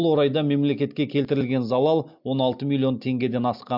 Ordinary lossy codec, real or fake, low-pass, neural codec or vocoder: MP3, 48 kbps; real; 5.4 kHz; none